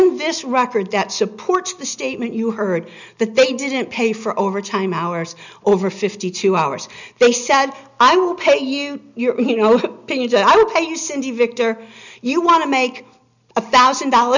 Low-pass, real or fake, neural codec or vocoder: 7.2 kHz; real; none